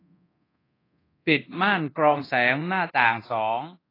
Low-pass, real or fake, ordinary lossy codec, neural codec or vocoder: 5.4 kHz; fake; AAC, 24 kbps; codec, 24 kHz, 0.9 kbps, DualCodec